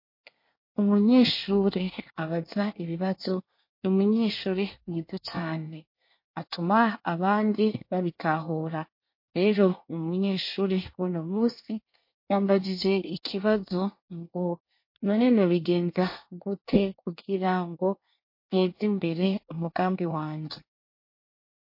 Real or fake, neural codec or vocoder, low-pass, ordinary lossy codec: fake; codec, 24 kHz, 1 kbps, SNAC; 5.4 kHz; MP3, 32 kbps